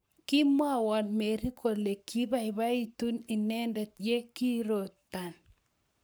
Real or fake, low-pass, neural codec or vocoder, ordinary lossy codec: fake; none; codec, 44.1 kHz, 7.8 kbps, Pupu-Codec; none